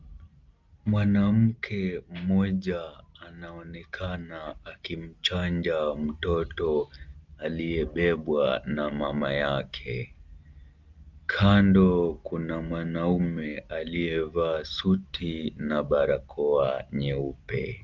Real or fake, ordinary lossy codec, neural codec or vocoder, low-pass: real; Opus, 32 kbps; none; 7.2 kHz